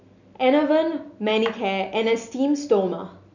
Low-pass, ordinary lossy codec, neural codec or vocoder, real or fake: 7.2 kHz; none; none; real